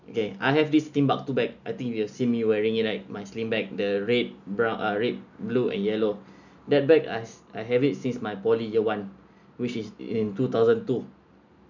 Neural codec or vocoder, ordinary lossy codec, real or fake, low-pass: none; none; real; 7.2 kHz